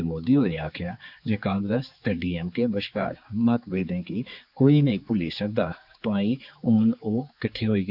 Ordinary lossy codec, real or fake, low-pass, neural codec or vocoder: none; fake; 5.4 kHz; codec, 16 kHz, 4 kbps, X-Codec, HuBERT features, trained on general audio